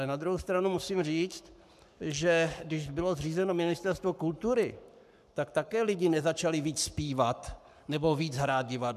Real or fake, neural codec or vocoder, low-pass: fake; codec, 44.1 kHz, 7.8 kbps, Pupu-Codec; 14.4 kHz